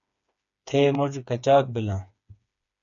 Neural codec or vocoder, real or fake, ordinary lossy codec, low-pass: codec, 16 kHz, 4 kbps, FreqCodec, smaller model; fake; MP3, 96 kbps; 7.2 kHz